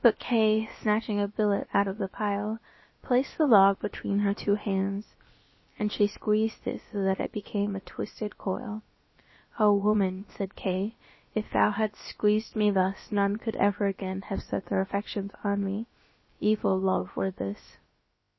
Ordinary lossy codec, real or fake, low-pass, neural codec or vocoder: MP3, 24 kbps; fake; 7.2 kHz; codec, 16 kHz, about 1 kbps, DyCAST, with the encoder's durations